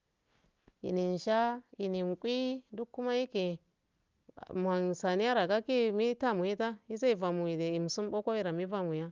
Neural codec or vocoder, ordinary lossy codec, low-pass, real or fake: none; Opus, 32 kbps; 7.2 kHz; real